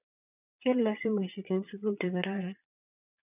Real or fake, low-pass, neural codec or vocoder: fake; 3.6 kHz; vocoder, 22.05 kHz, 80 mel bands, Vocos